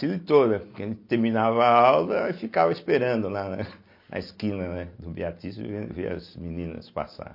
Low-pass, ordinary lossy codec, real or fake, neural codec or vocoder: 5.4 kHz; MP3, 32 kbps; real; none